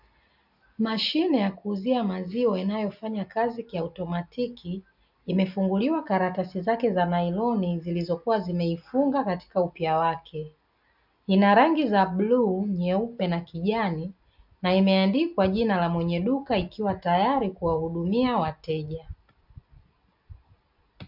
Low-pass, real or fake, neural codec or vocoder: 5.4 kHz; real; none